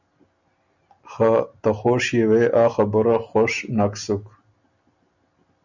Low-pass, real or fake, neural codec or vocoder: 7.2 kHz; real; none